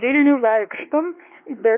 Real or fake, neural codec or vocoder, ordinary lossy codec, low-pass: fake; codec, 16 kHz, 2 kbps, X-Codec, WavLM features, trained on Multilingual LibriSpeech; MP3, 32 kbps; 3.6 kHz